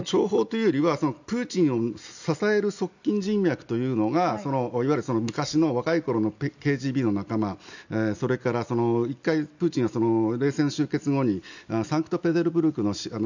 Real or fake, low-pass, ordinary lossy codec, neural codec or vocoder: real; 7.2 kHz; none; none